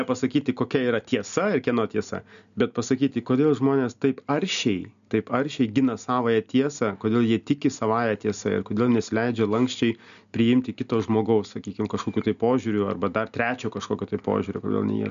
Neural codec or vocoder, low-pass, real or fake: none; 7.2 kHz; real